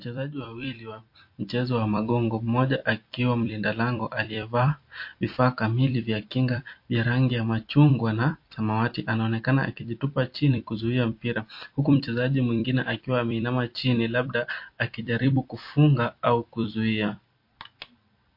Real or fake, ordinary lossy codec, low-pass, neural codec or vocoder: fake; MP3, 32 kbps; 5.4 kHz; vocoder, 44.1 kHz, 128 mel bands every 256 samples, BigVGAN v2